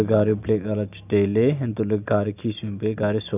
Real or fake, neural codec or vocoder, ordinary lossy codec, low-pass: real; none; none; 3.6 kHz